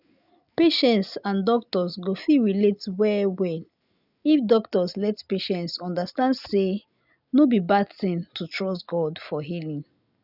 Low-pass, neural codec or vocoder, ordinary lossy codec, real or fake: 5.4 kHz; none; none; real